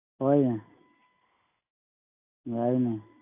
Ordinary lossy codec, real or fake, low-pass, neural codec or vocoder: AAC, 16 kbps; real; 3.6 kHz; none